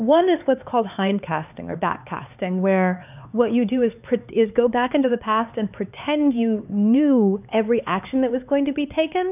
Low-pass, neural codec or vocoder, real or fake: 3.6 kHz; codec, 16 kHz, 4 kbps, X-Codec, HuBERT features, trained on LibriSpeech; fake